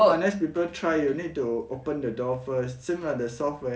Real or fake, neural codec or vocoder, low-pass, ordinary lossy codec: real; none; none; none